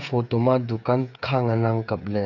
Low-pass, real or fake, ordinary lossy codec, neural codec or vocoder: 7.2 kHz; fake; none; codec, 16 kHz, 8 kbps, FreqCodec, smaller model